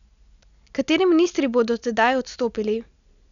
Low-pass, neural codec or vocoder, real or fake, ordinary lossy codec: 7.2 kHz; none; real; none